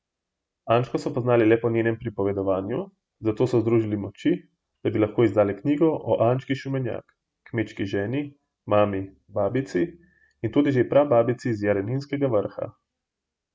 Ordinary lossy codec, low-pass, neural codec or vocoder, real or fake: none; none; none; real